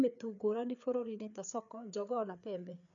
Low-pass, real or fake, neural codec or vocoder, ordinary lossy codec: 7.2 kHz; fake; codec, 16 kHz, 4 kbps, FreqCodec, larger model; none